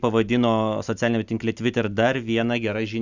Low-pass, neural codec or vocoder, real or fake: 7.2 kHz; none; real